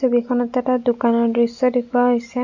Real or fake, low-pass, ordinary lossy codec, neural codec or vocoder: real; 7.2 kHz; none; none